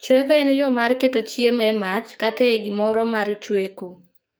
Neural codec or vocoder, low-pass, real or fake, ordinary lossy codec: codec, 44.1 kHz, 2.6 kbps, SNAC; none; fake; none